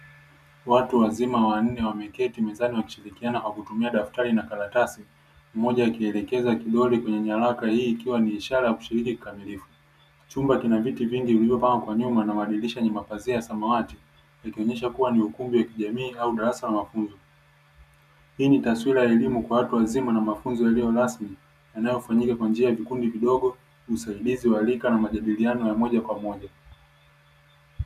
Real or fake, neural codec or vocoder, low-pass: real; none; 14.4 kHz